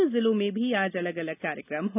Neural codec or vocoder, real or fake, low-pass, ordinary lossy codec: none; real; 3.6 kHz; none